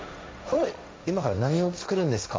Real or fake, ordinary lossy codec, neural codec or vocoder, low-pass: fake; none; codec, 16 kHz, 1.1 kbps, Voila-Tokenizer; none